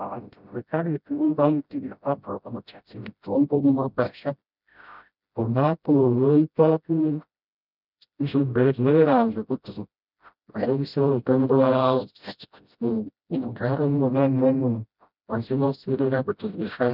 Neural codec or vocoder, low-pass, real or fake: codec, 16 kHz, 0.5 kbps, FreqCodec, smaller model; 5.4 kHz; fake